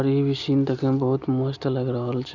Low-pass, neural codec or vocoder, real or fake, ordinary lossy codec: 7.2 kHz; none; real; none